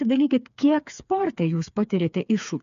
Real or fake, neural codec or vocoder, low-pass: fake; codec, 16 kHz, 4 kbps, FreqCodec, smaller model; 7.2 kHz